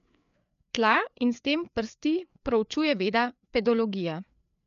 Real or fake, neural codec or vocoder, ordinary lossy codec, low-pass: fake; codec, 16 kHz, 4 kbps, FreqCodec, larger model; none; 7.2 kHz